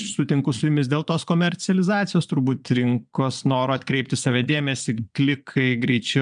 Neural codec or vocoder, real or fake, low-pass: vocoder, 22.05 kHz, 80 mel bands, Vocos; fake; 9.9 kHz